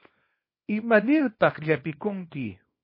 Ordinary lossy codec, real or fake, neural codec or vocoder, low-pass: MP3, 24 kbps; fake; codec, 24 kHz, 0.9 kbps, WavTokenizer, small release; 5.4 kHz